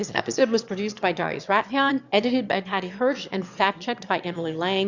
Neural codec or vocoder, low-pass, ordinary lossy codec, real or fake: autoencoder, 22.05 kHz, a latent of 192 numbers a frame, VITS, trained on one speaker; 7.2 kHz; Opus, 64 kbps; fake